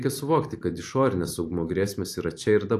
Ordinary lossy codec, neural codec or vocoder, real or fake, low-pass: AAC, 64 kbps; vocoder, 44.1 kHz, 128 mel bands every 512 samples, BigVGAN v2; fake; 14.4 kHz